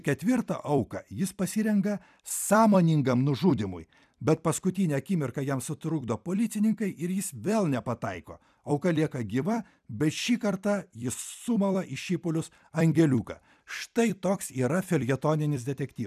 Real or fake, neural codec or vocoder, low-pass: fake; vocoder, 44.1 kHz, 128 mel bands every 256 samples, BigVGAN v2; 14.4 kHz